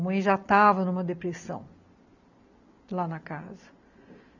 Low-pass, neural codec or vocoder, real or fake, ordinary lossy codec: 7.2 kHz; none; real; none